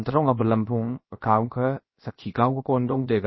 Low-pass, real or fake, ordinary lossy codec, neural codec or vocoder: 7.2 kHz; fake; MP3, 24 kbps; codec, 16 kHz, about 1 kbps, DyCAST, with the encoder's durations